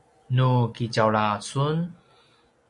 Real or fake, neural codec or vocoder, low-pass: real; none; 10.8 kHz